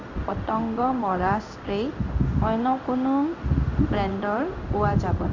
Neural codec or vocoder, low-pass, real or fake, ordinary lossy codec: codec, 16 kHz in and 24 kHz out, 1 kbps, XY-Tokenizer; 7.2 kHz; fake; MP3, 64 kbps